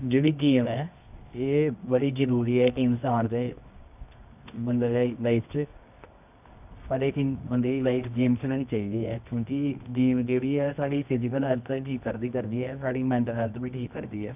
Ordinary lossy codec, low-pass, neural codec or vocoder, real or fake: none; 3.6 kHz; codec, 24 kHz, 0.9 kbps, WavTokenizer, medium music audio release; fake